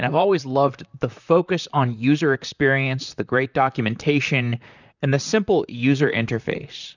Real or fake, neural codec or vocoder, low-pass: fake; vocoder, 44.1 kHz, 128 mel bands, Pupu-Vocoder; 7.2 kHz